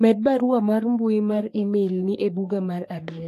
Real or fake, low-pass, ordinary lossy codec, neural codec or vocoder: fake; 14.4 kHz; AAC, 64 kbps; codec, 44.1 kHz, 3.4 kbps, Pupu-Codec